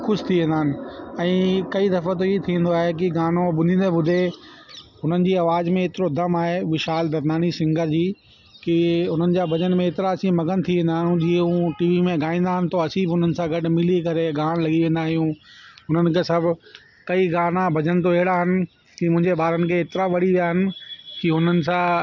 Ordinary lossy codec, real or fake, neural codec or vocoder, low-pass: none; real; none; 7.2 kHz